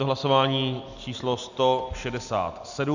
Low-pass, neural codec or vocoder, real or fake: 7.2 kHz; none; real